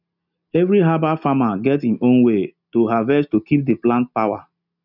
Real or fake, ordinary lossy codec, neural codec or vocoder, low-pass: real; none; none; 5.4 kHz